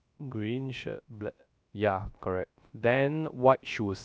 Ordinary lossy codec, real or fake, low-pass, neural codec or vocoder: none; fake; none; codec, 16 kHz, 0.3 kbps, FocalCodec